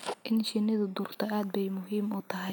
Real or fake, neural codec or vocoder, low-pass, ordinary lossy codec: real; none; none; none